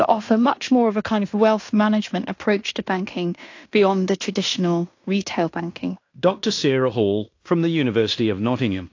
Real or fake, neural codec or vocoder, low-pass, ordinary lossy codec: fake; codec, 16 kHz in and 24 kHz out, 0.9 kbps, LongCat-Audio-Codec, fine tuned four codebook decoder; 7.2 kHz; AAC, 48 kbps